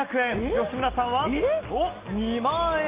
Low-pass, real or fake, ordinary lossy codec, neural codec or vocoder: 3.6 kHz; real; Opus, 24 kbps; none